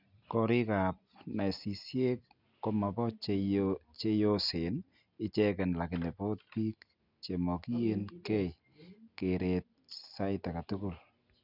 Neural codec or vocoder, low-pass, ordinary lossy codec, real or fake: none; 5.4 kHz; none; real